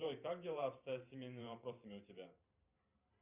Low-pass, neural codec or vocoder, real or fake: 3.6 kHz; vocoder, 44.1 kHz, 128 mel bands every 512 samples, BigVGAN v2; fake